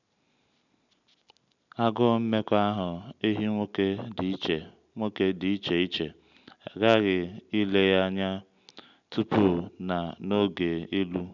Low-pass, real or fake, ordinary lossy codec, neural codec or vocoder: 7.2 kHz; real; none; none